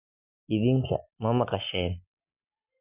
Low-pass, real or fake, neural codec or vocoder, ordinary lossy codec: 3.6 kHz; real; none; none